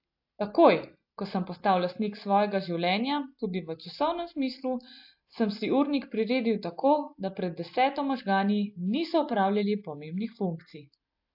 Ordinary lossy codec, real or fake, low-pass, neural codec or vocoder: AAC, 48 kbps; real; 5.4 kHz; none